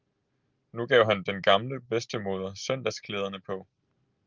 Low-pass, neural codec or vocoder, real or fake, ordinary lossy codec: 7.2 kHz; none; real; Opus, 32 kbps